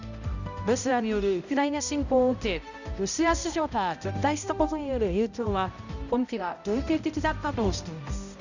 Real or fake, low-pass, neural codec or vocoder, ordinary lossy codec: fake; 7.2 kHz; codec, 16 kHz, 0.5 kbps, X-Codec, HuBERT features, trained on balanced general audio; none